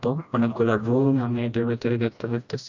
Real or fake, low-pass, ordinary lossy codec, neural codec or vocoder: fake; 7.2 kHz; MP3, 64 kbps; codec, 16 kHz, 1 kbps, FreqCodec, smaller model